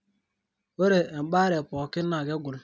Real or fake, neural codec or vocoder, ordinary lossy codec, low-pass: real; none; none; none